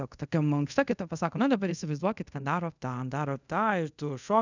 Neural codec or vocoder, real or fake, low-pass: codec, 24 kHz, 0.5 kbps, DualCodec; fake; 7.2 kHz